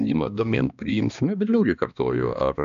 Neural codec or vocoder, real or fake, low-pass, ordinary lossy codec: codec, 16 kHz, 2 kbps, X-Codec, HuBERT features, trained on balanced general audio; fake; 7.2 kHz; AAC, 96 kbps